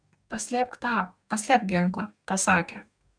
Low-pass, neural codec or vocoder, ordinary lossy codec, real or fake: 9.9 kHz; codec, 44.1 kHz, 2.6 kbps, DAC; MP3, 96 kbps; fake